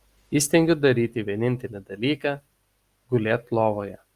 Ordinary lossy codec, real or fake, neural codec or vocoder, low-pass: Opus, 32 kbps; real; none; 14.4 kHz